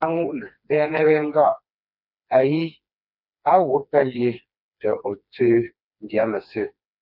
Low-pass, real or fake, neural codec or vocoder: 5.4 kHz; fake; codec, 16 kHz, 2 kbps, FreqCodec, smaller model